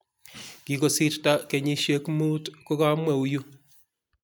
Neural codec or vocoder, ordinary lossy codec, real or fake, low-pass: none; none; real; none